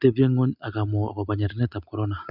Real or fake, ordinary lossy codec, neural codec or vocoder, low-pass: real; none; none; 5.4 kHz